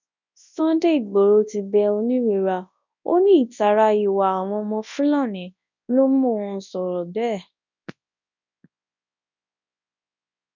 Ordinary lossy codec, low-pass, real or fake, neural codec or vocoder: none; 7.2 kHz; fake; codec, 24 kHz, 0.9 kbps, WavTokenizer, large speech release